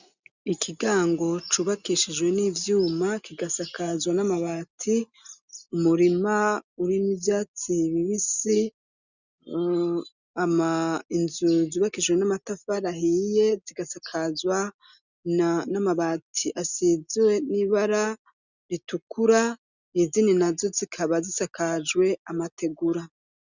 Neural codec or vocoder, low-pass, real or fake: none; 7.2 kHz; real